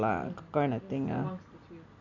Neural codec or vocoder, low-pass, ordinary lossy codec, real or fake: none; 7.2 kHz; none; real